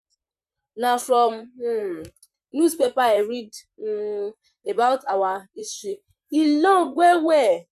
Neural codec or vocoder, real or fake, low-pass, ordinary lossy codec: vocoder, 44.1 kHz, 128 mel bands, Pupu-Vocoder; fake; 14.4 kHz; none